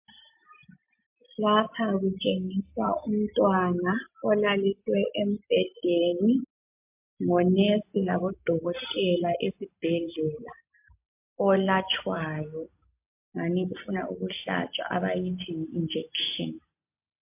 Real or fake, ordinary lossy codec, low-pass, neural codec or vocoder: real; MP3, 32 kbps; 3.6 kHz; none